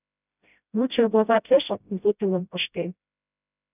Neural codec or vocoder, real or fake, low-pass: codec, 16 kHz, 0.5 kbps, FreqCodec, smaller model; fake; 3.6 kHz